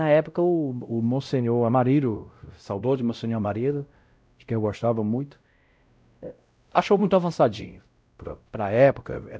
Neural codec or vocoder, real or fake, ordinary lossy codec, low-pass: codec, 16 kHz, 0.5 kbps, X-Codec, WavLM features, trained on Multilingual LibriSpeech; fake; none; none